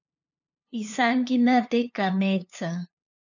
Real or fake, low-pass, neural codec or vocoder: fake; 7.2 kHz; codec, 16 kHz, 2 kbps, FunCodec, trained on LibriTTS, 25 frames a second